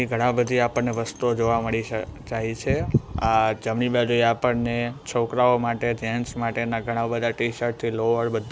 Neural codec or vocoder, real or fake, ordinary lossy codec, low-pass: none; real; none; none